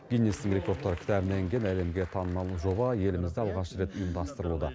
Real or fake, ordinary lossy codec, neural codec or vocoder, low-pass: real; none; none; none